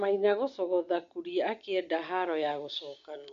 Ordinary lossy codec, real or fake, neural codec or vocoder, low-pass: MP3, 48 kbps; real; none; 7.2 kHz